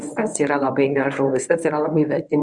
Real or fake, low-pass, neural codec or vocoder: fake; 10.8 kHz; codec, 24 kHz, 0.9 kbps, WavTokenizer, medium speech release version 1